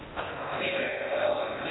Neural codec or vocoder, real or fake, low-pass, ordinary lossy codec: codec, 16 kHz in and 24 kHz out, 0.6 kbps, FocalCodec, streaming, 2048 codes; fake; 7.2 kHz; AAC, 16 kbps